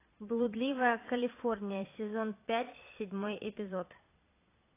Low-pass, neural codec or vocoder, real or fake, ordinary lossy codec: 3.6 kHz; vocoder, 22.05 kHz, 80 mel bands, Vocos; fake; AAC, 24 kbps